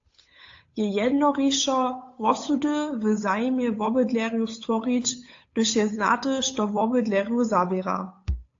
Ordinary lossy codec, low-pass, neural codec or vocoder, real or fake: AAC, 48 kbps; 7.2 kHz; codec, 16 kHz, 8 kbps, FunCodec, trained on Chinese and English, 25 frames a second; fake